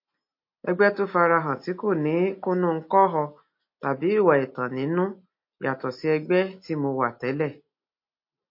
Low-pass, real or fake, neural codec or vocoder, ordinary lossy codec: 5.4 kHz; real; none; MP3, 32 kbps